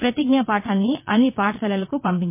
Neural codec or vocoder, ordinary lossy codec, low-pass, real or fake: vocoder, 22.05 kHz, 80 mel bands, Vocos; MP3, 24 kbps; 3.6 kHz; fake